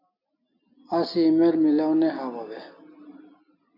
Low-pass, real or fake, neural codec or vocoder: 5.4 kHz; real; none